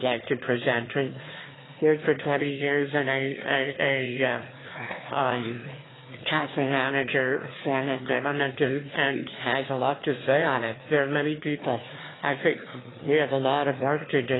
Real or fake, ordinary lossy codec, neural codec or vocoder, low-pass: fake; AAC, 16 kbps; autoencoder, 22.05 kHz, a latent of 192 numbers a frame, VITS, trained on one speaker; 7.2 kHz